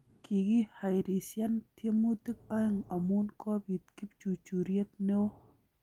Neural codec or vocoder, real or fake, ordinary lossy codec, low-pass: none; real; Opus, 32 kbps; 19.8 kHz